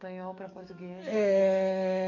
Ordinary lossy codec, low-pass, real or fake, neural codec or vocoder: none; 7.2 kHz; fake; codec, 44.1 kHz, 7.8 kbps, DAC